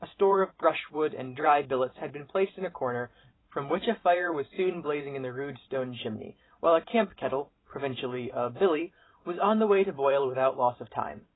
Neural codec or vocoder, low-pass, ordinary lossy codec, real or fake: vocoder, 44.1 kHz, 128 mel bands every 512 samples, BigVGAN v2; 7.2 kHz; AAC, 16 kbps; fake